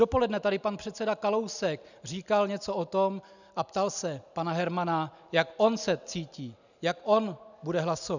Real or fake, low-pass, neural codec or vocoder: real; 7.2 kHz; none